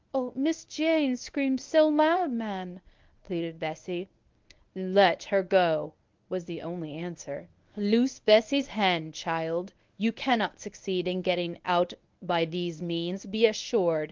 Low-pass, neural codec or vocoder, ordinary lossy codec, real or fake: 7.2 kHz; codec, 24 kHz, 0.9 kbps, WavTokenizer, medium speech release version 1; Opus, 24 kbps; fake